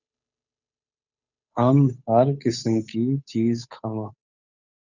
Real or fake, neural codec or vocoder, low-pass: fake; codec, 16 kHz, 8 kbps, FunCodec, trained on Chinese and English, 25 frames a second; 7.2 kHz